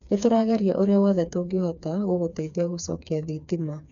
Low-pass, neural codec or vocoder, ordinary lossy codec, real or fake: 7.2 kHz; codec, 16 kHz, 4 kbps, FreqCodec, smaller model; none; fake